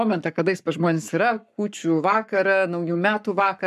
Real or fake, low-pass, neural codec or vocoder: fake; 14.4 kHz; codec, 44.1 kHz, 7.8 kbps, Pupu-Codec